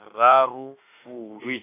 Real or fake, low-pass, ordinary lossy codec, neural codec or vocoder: fake; 3.6 kHz; AAC, 16 kbps; autoencoder, 48 kHz, 32 numbers a frame, DAC-VAE, trained on Japanese speech